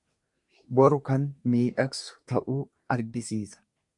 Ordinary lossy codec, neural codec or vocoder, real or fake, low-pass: MP3, 64 kbps; codec, 24 kHz, 1 kbps, SNAC; fake; 10.8 kHz